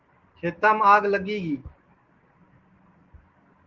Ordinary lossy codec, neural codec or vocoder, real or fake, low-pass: Opus, 16 kbps; none; real; 7.2 kHz